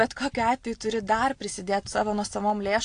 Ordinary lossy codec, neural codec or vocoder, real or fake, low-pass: AAC, 48 kbps; none; real; 9.9 kHz